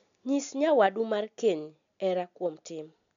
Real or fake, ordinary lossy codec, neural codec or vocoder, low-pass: real; none; none; 7.2 kHz